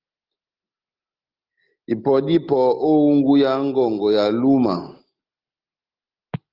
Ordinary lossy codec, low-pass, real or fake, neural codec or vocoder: Opus, 32 kbps; 5.4 kHz; real; none